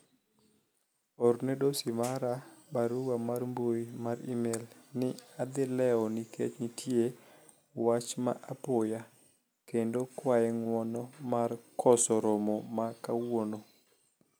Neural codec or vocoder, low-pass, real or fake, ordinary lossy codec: none; none; real; none